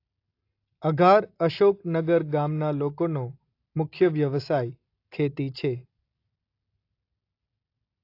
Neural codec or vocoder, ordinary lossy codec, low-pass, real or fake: none; AAC, 32 kbps; 5.4 kHz; real